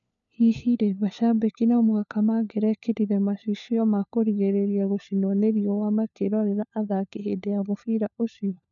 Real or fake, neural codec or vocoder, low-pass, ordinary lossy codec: fake; codec, 16 kHz, 4 kbps, FunCodec, trained on LibriTTS, 50 frames a second; 7.2 kHz; none